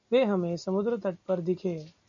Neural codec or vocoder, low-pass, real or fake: none; 7.2 kHz; real